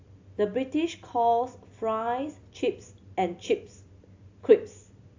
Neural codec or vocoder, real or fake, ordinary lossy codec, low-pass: none; real; AAC, 48 kbps; 7.2 kHz